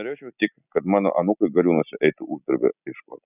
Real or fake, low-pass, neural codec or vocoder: real; 3.6 kHz; none